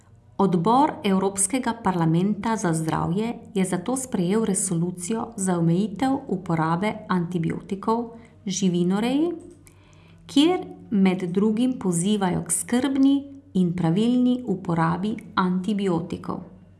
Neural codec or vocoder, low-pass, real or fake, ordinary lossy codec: none; none; real; none